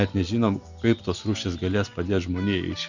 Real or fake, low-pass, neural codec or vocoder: real; 7.2 kHz; none